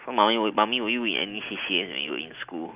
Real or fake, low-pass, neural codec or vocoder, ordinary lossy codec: real; 3.6 kHz; none; Opus, 24 kbps